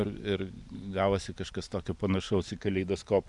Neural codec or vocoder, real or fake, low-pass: vocoder, 44.1 kHz, 128 mel bands every 512 samples, BigVGAN v2; fake; 10.8 kHz